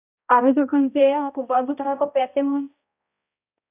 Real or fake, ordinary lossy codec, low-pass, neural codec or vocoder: fake; none; 3.6 kHz; codec, 16 kHz, 0.5 kbps, X-Codec, HuBERT features, trained on balanced general audio